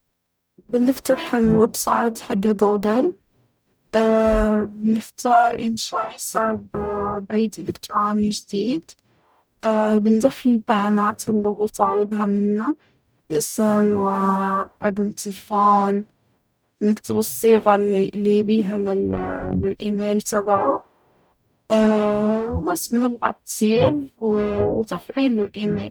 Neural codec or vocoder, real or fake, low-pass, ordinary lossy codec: codec, 44.1 kHz, 0.9 kbps, DAC; fake; none; none